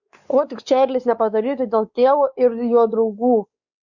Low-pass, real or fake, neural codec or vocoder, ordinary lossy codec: 7.2 kHz; fake; codec, 16 kHz, 4 kbps, X-Codec, WavLM features, trained on Multilingual LibriSpeech; Opus, 64 kbps